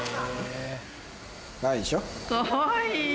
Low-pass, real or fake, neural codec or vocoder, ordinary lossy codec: none; real; none; none